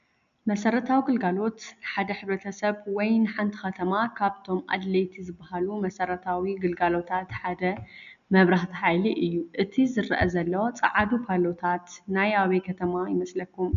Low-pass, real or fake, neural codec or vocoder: 7.2 kHz; real; none